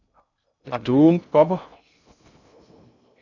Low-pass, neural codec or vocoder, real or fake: 7.2 kHz; codec, 16 kHz in and 24 kHz out, 0.6 kbps, FocalCodec, streaming, 2048 codes; fake